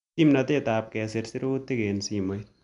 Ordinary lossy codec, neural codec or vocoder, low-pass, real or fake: none; none; 10.8 kHz; real